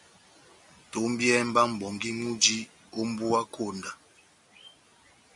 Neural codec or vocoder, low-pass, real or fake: none; 10.8 kHz; real